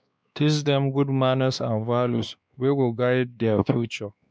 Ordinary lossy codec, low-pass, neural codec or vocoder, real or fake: none; none; codec, 16 kHz, 2 kbps, X-Codec, WavLM features, trained on Multilingual LibriSpeech; fake